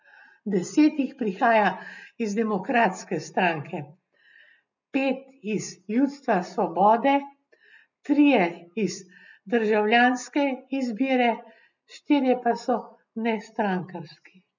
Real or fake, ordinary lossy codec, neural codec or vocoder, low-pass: real; none; none; 7.2 kHz